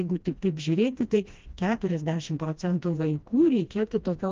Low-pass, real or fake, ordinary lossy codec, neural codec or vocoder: 7.2 kHz; fake; Opus, 24 kbps; codec, 16 kHz, 1 kbps, FreqCodec, smaller model